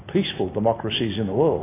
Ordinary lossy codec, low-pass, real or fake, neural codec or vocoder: AAC, 16 kbps; 3.6 kHz; real; none